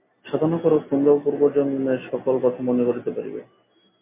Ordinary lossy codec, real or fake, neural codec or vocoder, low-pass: MP3, 32 kbps; real; none; 3.6 kHz